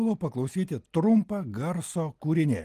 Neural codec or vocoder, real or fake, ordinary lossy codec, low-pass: none; real; Opus, 24 kbps; 14.4 kHz